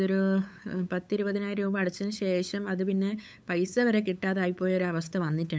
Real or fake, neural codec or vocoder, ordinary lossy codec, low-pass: fake; codec, 16 kHz, 8 kbps, FunCodec, trained on LibriTTS, 25 frames a second; none; none